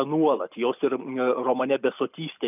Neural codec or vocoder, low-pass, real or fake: none; 3.6 kHz; real